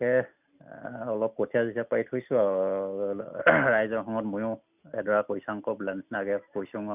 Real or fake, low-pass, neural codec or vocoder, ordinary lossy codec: real; 3.6 kHz; none; none